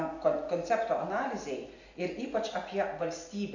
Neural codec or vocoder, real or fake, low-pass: none; real; 7.2 kHz